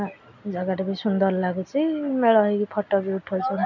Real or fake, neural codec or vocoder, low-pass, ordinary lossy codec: real; none; 7.2 kHz; none